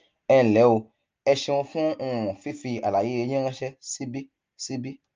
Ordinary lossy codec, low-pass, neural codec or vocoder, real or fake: Opus, 24 kbps; 7.2 kHz; none; real